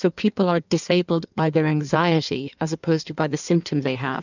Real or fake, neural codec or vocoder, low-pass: fake; codec, 16 kHz in and 24 kHz out, 1.1 kbps, FireRedTTS-2 codec; 7.2 kHz